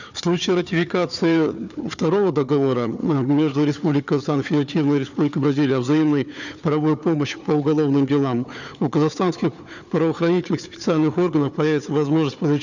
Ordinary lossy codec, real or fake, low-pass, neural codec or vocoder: none; fake; 7.2 kHz; codec, 16 kHz, 4 kbps, FunCodec, trained on Chinese and English, 50 frames a second